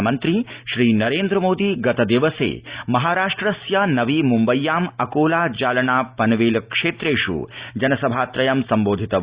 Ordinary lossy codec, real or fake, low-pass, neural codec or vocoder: Opus, 64 kbps; real; 3.6 kHz; none